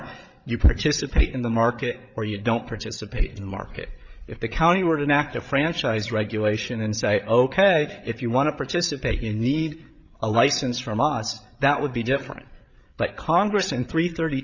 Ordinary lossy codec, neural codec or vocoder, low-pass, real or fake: Opus, 64 kbps; codec, 16 kHz, 8 kbps, FreqCodec, larger model; 7.2 kHz; fake